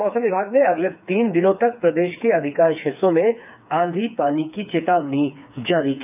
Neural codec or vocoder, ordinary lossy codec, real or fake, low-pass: codec, 16 kHz, 4 kbps, FreqCodec, smaller model; none; fake; 3.6 kHz